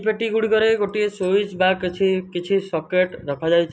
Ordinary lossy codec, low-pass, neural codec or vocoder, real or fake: none; none; none; real